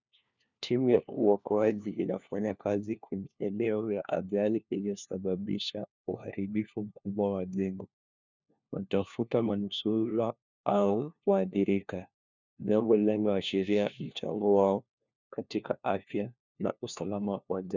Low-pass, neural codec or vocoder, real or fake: 7.2 kHz; codec, 16 kHz, 1 kbps, FunCodec, trained on LibriTTS, 50 frames a second; fake